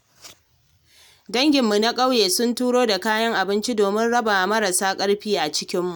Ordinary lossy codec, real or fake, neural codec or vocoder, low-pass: none; real; none; none